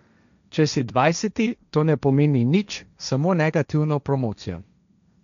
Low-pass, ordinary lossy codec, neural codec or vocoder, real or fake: 7.2 kHz; none; codec, 16 kHz, 1.1 kbps, Voila-Tokenizer; fake